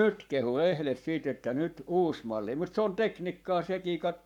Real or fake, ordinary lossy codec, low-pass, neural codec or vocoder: fake; none; 19.8 kHz; codec, 44.1 kHz, 7.8 kbps, Pupu-Codec